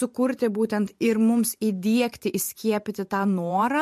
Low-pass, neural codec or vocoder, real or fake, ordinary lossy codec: 14.4 kHz; vocoder, 44.1 kHz, 128 mel bands every 512 samples, BigVGAN v2; fake; MP3, 64 kbps